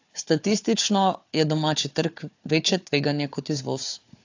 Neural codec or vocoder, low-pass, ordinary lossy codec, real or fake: codec, 16 kHz, 4 kbps, FunCodec, trained on Chinese and English, 50 frames a second; 7.2 kHz; AAC, 48 kbps; fake